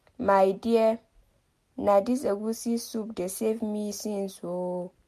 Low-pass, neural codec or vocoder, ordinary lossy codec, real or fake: 14.4 kHz; none; MP3, 64 kbps; real